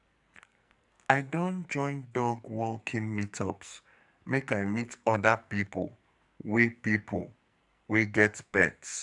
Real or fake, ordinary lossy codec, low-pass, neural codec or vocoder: fake; none; 10.8 kHz; codec, 44.1 kHz, 2.6 kbps, SNAC